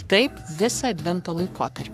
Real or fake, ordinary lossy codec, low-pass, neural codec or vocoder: fake; AAC, 96 kbps; 14.4 kHz; codec, 44.1 kHz, 3.4 kbps, Pupu-Codec